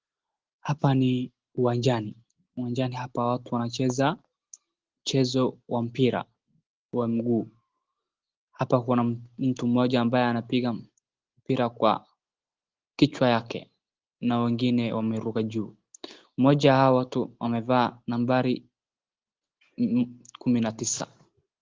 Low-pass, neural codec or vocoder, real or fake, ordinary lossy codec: 7.2 kHz; none; real; Opus, 16 kbps